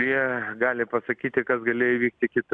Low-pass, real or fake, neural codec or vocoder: 9.9 kHz; real; none